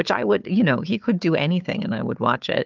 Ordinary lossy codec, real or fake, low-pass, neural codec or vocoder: Opus, 24 kbps; fake; 7.2 kHz; codec, 16 kHz, 4 kbps, FunCodec, trained on Chinese and English, 50 frames a second